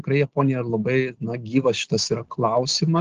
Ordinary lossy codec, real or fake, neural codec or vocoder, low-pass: Opus, 16 kbps; real; none; 7.2 kHz